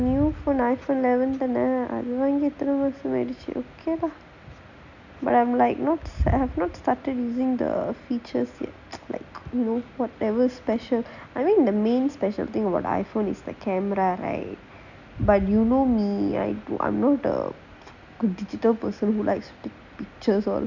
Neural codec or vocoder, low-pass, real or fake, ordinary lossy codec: none; 7.2 kHz; real; none